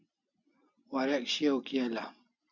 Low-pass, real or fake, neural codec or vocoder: 7.2 kHz; real; none